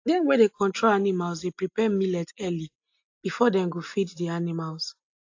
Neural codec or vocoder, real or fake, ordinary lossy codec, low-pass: none; real; AAC, 48 kbps; 7.2 kHz